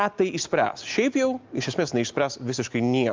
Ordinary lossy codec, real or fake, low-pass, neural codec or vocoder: Opus, 24 kbps; real; 7.2 kHz; none